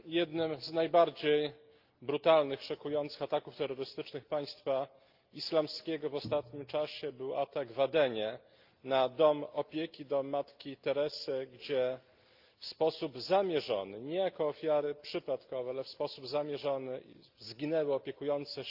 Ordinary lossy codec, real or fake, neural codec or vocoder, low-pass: Opus, 24 kbps; real; none; 5.4 kHz